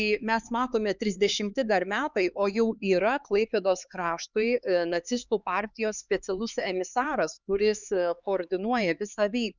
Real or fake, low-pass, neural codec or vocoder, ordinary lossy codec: fake; 7.2 kHz; codec, 16 kHz, 4 kbps, X-Codec, HuBERT features, trained on LibriSpeech; Opus, 64 kbps